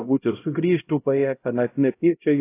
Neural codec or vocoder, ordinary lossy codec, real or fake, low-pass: codec, 16 kHz, 0.5 kbps, X-Codec, HuBERT features, trained on LibriSpeech; AAC, 24 kbps; fake; 3.6 kHz